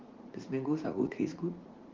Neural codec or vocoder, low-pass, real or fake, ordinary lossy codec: vocoder, 44.1 kHz, 80 mel bands, Vocos; 7.2 kHz; fake; Opus, 16 kbps